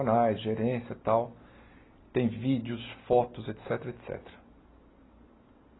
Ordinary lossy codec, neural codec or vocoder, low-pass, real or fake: AAC, 16 kbps; none; 7.2 kHz; real